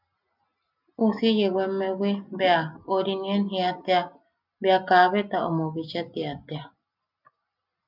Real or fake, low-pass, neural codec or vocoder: real; 5.4 kHz; none